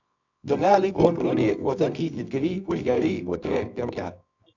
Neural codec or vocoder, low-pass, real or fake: codec, 24 kHz, 0.9 kbps, WavTokenizer, medium music audio release; 7.2 kHz; fake